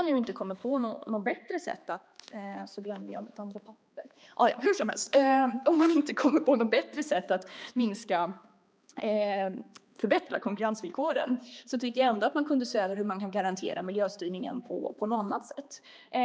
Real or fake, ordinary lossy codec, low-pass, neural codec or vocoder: fake; none; none; codec, 16 kHz, 2 kbps, X-Codec, HuBERT features, trained on balanced general audio